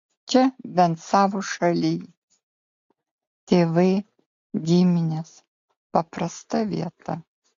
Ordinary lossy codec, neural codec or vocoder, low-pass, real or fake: AAC, 48 kbps; none; 7.2 kHz; real